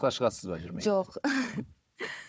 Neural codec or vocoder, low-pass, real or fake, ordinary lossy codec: codec, 16 kHz, 4 kbps, FunCodec, trained on Chinese and English, 50 frames a second; none; fake; none